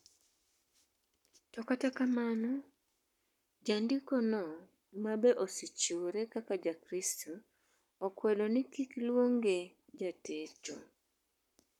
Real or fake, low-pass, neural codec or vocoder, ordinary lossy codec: fake; 19.8 kHz; codec, 44.1 kHz, 7.8 kbps, Pupu-Codec; none